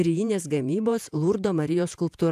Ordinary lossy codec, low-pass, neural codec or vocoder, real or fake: AAC, 96 kbps; 14.4 kHz; vocoder, 48 kHz, 128 mel bands, Vocos; fake